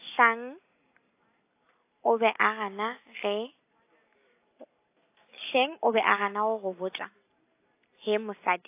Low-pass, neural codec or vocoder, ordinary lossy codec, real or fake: 3.6 kHz; none; AAC, 24 kbps; real